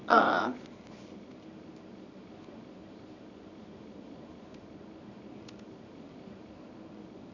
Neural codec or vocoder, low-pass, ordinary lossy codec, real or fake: codec, 24 kHz, 0.9 kbps, WavTokenizer, medium music audio release; 7.2 kHz; none; fake